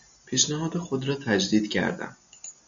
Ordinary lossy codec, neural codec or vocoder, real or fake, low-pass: AAC, 64 kbps; none; real; 7.2 kHz